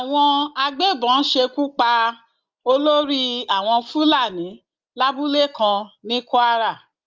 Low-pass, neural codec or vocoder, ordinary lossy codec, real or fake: 7.2 kHz; none; Opus, 32 kbps; real